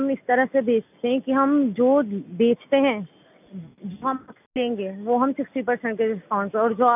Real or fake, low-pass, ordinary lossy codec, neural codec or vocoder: real; 3.6 kHz; none; none